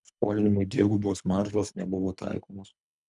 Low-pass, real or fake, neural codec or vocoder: 10.8 kHz; fake; codec, 24 kHz, 3 kbps, HILCodec